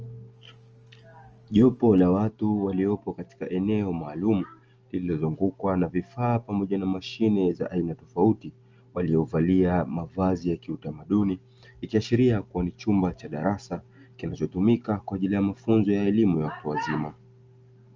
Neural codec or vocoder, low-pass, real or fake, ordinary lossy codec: none; 7.2 kHz; real; Opus, 24 kbps